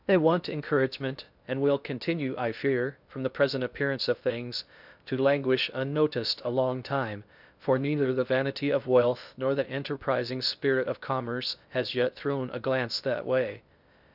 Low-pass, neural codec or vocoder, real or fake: 5.4 kHz; codec, 16 kHz in and 24 kHz out, 0.6 kbps, FocalCodec, streaming, 2048 codes; fake